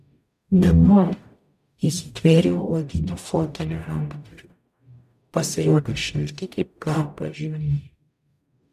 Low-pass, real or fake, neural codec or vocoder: 14.4 kHz; fake; codec, 44.1 kHz, 0.9 kbps, DAC